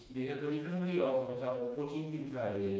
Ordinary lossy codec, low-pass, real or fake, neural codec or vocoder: none; none; fake; codec, 16 kHz, 2 kbps, FreqCodec, smaller model